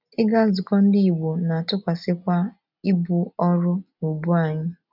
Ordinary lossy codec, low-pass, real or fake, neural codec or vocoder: none; 5.4 kHz; real; none